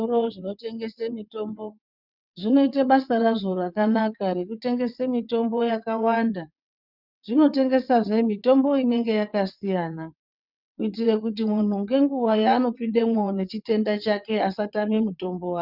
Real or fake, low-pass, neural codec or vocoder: fake; 5.4 kHz; vocoder, 22.05 kHz, 80 mel bands, WaveNeXt